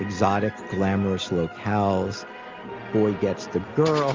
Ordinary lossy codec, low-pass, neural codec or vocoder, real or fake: Opus, 32 kbps; 7.2 kHz; none; real